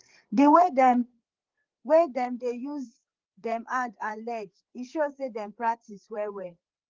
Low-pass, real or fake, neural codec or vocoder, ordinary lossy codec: 7.2 kHz; fake; codec, 16 kHz, 4 kbps, FreqCodec, larger model; Opus, 16 kbps